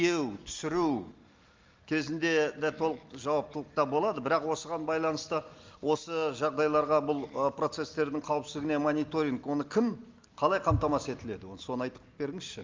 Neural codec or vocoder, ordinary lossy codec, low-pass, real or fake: none; Opus, 32 kbps; 7.2 kHz; real